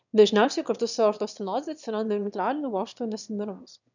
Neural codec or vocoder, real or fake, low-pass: autoencoder, 22.05 kHz, a latent of 192 numbers a frame, VITS, trained on one speaker; fake; 7.2 kHz